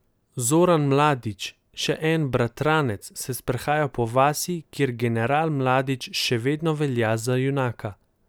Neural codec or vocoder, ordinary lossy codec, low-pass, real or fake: none; none; none; real